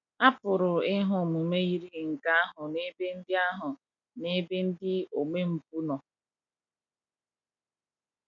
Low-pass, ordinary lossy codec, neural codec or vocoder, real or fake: 7.2 kHz; none; none; real